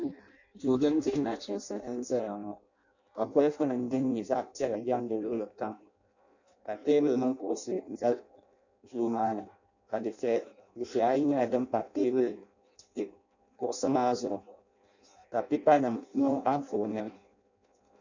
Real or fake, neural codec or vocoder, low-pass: fake; codec, 16 kHz in and 24 kHz out, 0.6 kbps, FireRedTTS-2 codec; 7.2 kHz